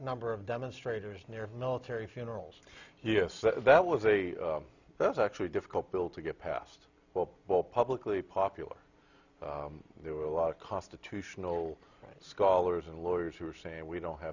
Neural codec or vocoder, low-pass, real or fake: none; 7.2 kHz; real